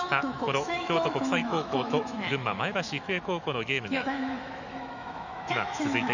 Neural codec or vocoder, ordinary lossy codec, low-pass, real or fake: autoencoder, 48 kHz, 128 numbers a frame, DAC-VAE, trained on Japanese speech; none; 7.2 kHz; fake